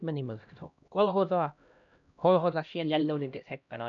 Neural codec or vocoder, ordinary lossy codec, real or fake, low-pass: codec, 16 kHz, 1 kbps, X-Codec, HuBERT features, trained on LibriSpeech; none; fake; 7.2 kHz